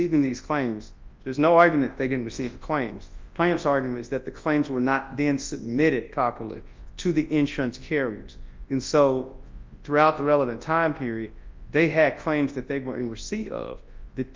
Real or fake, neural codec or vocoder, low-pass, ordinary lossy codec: fake; codec, 24 kHz, 0.9 kbps, WavTokenizer, large speech release; 7.2 kHz; Opus, 32 kbps